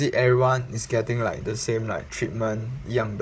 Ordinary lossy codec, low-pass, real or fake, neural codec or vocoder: none; none; fake; codec, 16 kHz, 16 kbps, FunCodec, trained on Chinese and English, 50 frames a second